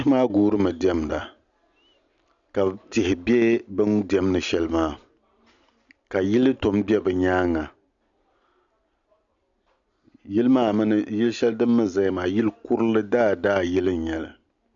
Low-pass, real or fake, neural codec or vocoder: 7.2 kHz; real; none